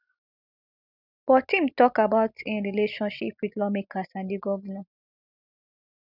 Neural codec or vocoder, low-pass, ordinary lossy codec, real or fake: none; 5.4 kHz; none; real